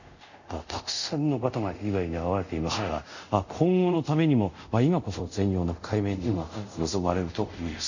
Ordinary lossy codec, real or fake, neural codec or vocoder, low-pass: none; fake; codec, 24 kHz, 0.5 kbps, DualCodec; 7.2 kHz